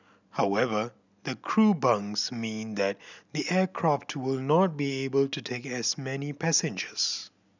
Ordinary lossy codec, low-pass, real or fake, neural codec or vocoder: none; 7.2 kHz; real; none